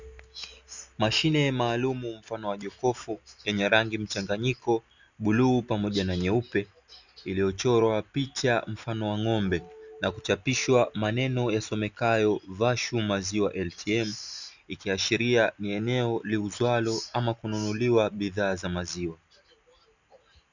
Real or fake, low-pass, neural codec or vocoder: real; 7.2 kHz; none